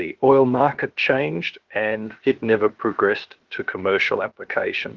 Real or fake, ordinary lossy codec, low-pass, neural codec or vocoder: fake; Opus, 16 kbps; 7.2 kHz; codec, 16 kHz, about 1 kbps, DyCAST, with the encoder's durations